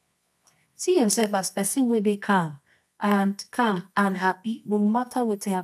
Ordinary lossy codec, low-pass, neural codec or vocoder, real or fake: none; none; codec, 24 kHz, 0.9 kbps, WavTokenizer, medium music audio release; fake